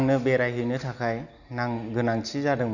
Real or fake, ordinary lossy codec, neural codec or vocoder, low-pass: real; none; none; 7.2 kHz